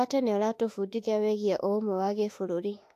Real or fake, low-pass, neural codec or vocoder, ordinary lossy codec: fake; 14.4 kHz; autoencoder, 48 kHz, 32 numbers a frame, DAC-VAE, trained on Japanese speech; AAC, 64 kbps